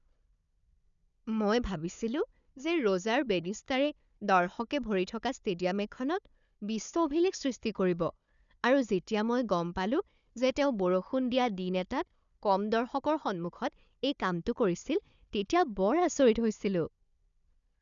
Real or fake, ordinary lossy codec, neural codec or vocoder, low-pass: fake; none; codec, 16 kHz, 4 kbps, FunCodec, trained on Chinese and English, 50 frames a second; 7.2 kHz